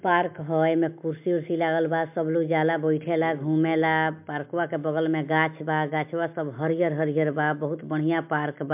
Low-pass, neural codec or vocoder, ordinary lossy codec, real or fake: 3.6 kHz; none; MP3, 32 kbps; real